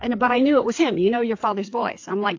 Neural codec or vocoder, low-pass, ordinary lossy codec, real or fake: codec, 16 kHz, 4 kbps, FreqCodec, larger model; 7.2 kHz; AAC, 48 kbps; fake